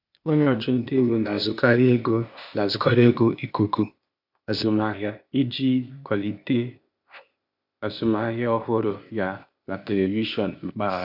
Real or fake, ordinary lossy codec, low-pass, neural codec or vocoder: fake; none; 5.4 kHz; codec, 16 kHz, 0.8 kbps, ZipCodec